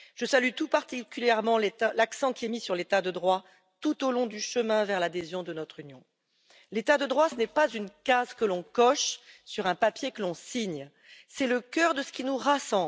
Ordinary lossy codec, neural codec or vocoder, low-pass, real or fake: none; none; none; real